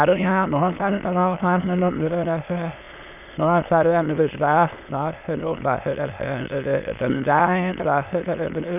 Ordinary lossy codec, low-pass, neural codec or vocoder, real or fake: none; 3.6 kHz; autoencoder, 22.05 kHz, a latent of 192 numbers a frame, VITS, trained on many speakers; fake